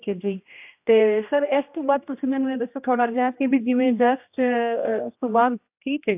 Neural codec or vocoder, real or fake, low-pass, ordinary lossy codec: codec, 16 kHz, 1 kbps, X-Codec, HuBERT features, trained on general audio; fake; 3.6 kHz; AAC, 32 kbps